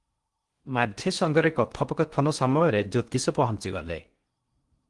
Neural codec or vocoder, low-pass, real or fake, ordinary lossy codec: codec, 16 kHz in and 24 kHz out, 0.6 kbps, FocalCodec, streaming, 4096 codes; 10.8 kHz; fake; Opus, 24 kbps